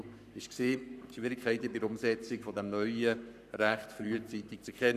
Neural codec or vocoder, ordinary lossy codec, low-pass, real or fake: codec, 44.1 kHz, 7.8 kbps, Pupu-Codec; none; 14.4 kHz; fake